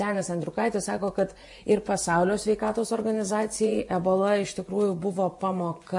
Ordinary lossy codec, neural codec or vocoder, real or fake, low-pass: MP3, 48 kbps; vocoder, 24 kHz, 100 mel bands, Vocos; fake; 10.8 kHz